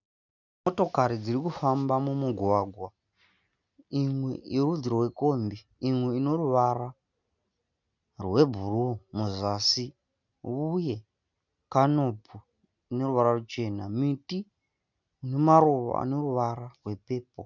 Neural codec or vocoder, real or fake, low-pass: none; real; 7.2 kHz